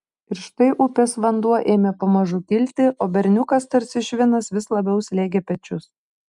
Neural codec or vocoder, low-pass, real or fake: none; 10.8 kHz; real